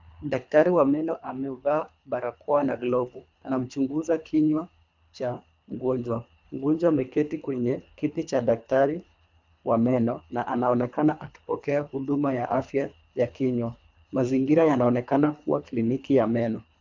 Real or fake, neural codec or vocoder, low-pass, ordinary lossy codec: fake; codec, 24 kHz, 3 kbps, HILCodec; 7.2 kHz; MP3, 64 kbps